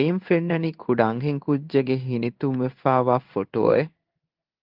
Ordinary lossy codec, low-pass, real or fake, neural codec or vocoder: Opus, 32 kbps; 5.4 kHz; fake; codec, 16 kHz in and 24 kHz out, 1 kbps, XY-Tokenizer